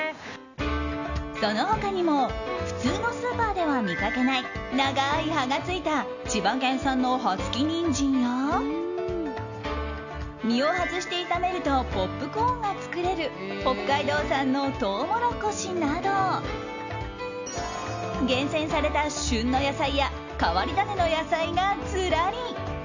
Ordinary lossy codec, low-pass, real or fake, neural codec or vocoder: none; 7.2 kHz; real; none